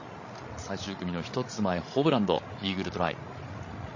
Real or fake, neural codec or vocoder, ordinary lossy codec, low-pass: fake; codec, 16 kHz, 16 kbps, FreqCodec, larger model; MP3, 32 kbps; 7.2 kHz